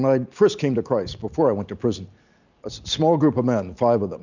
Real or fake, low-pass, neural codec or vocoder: real; 7.2 kHz; none